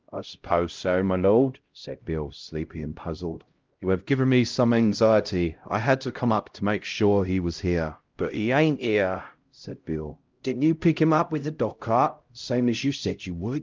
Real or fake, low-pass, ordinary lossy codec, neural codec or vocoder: fake; 7.2 kHz; Opus, 32 kbps; codec, 16 kHz, 0.5 kbps, X-Codec, HuBERT features, trained on LibriSpeech